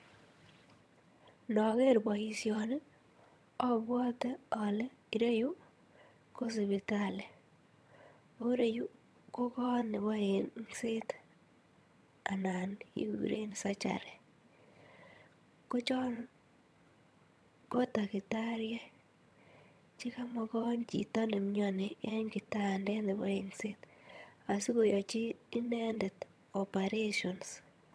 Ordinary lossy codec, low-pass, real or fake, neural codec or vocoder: none; none; fake; vocoder, 22.05 kHz, 80 mel bands, HiFi-GAN